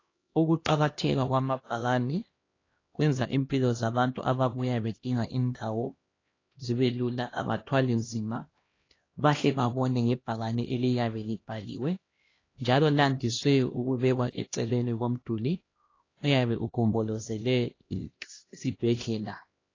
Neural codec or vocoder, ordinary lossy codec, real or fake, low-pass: codec, 16 kHz, 1 kbps, X-Codec, HuBERT features, trained on LibriSpeech; AAC, 32 kbps; fake; 7.2 kHz